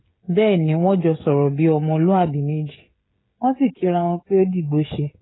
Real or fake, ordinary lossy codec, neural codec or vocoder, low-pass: fake; AAC, 16 kbps; codec, 16 kHz, 16 kbps, FreqCodec, smaller model; 7.2 kHz